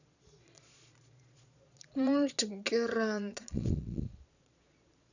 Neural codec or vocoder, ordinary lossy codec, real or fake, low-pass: vocoder, 22.05 kHz, 80 mel bands, Vocos; none; fake; 7.2 kHz